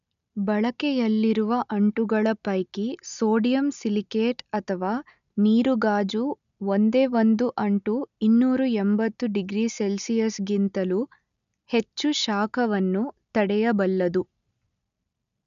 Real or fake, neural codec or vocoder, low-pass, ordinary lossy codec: real; none; 7.2 kHz; none